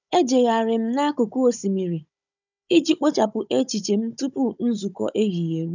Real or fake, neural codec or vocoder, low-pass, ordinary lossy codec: fake; codec, 16 kHz, 16 kbps, FunCodec, trained on Chinese and English, 50 frames a second; 7.2 kHz; none